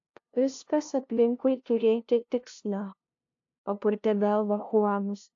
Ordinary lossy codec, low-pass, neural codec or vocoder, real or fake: AAC, 48 kbps; 7.2 kHz; codec, 16 kHz, 0.5 kbps, FunCodec, trained on LibriTTS, 25 frames a second; fake